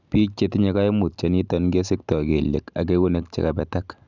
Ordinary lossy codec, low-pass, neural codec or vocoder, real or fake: none; 7.2 kHz; none; real